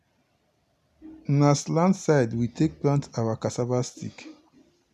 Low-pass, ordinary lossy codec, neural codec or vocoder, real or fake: 14.4 kHz; none; none; real